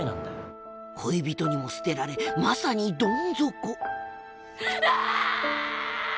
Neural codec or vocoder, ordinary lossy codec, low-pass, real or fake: none; none; none; real